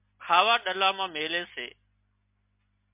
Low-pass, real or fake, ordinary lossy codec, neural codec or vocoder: 3.6 kHz; real; MP3, 32 kbps; none